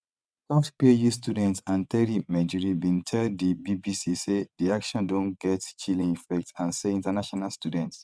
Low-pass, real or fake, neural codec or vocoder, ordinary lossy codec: none; fake; vocoder, 22.05 kHz, 80 mel bands, WaveNeXt; none